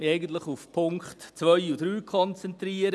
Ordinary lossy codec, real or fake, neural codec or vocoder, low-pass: none; real; none; none